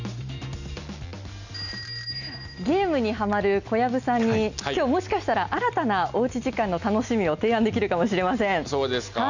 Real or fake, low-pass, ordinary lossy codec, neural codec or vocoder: real; 7.2 kHz; none; none